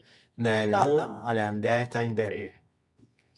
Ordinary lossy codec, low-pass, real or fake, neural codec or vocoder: MP3, 64 kbps; 10.8 kHz; fake; codec, 24 kHz, 0.9 kbps, WavTokenizer, medium music audio release